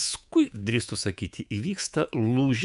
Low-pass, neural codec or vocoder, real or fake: 10.8 kHz; codec, 24 kHz, 3.1 kbps, DualCodec; fake